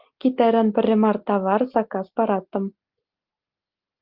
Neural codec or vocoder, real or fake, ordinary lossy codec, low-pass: none; real; Opus, 24 kbps; 5.4 kHz